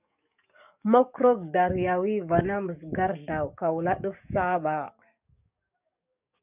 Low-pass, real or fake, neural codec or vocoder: 3.6 kHz; fake; codec, 44.1 kHz, 7.8 kbps, DAC